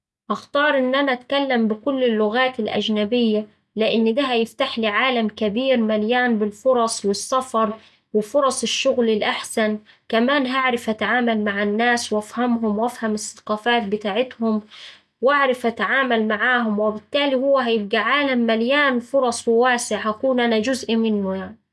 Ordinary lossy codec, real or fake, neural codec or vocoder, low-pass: none; real; none; none